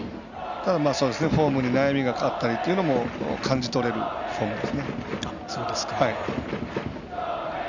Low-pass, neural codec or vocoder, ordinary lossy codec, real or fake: 7.2 kHz; none; none; real